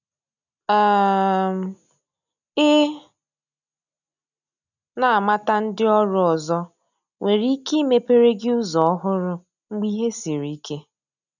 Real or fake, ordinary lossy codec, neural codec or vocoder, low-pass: real; none; none; 7.2 kHz